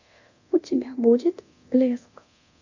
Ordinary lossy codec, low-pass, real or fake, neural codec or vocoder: AAC, 48 kbps; 7.2 kHz; fake; codec, 24 kHz, 0.9 kbps, DualCodec